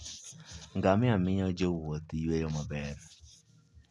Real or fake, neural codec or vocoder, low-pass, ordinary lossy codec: real; none; none; none